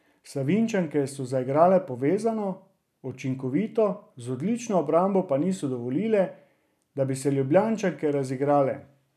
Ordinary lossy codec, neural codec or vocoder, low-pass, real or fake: none; none; 14.4 kHz; real